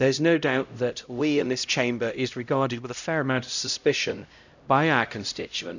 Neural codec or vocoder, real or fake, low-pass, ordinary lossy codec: codec, 16 kHz, 0.5 kbps, X-Codec, HuBERT features, trained on LibriSpeech; fake; 7.2 kHz; none